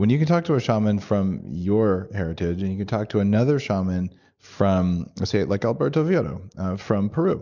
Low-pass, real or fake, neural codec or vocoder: 7.2 kHz; real; none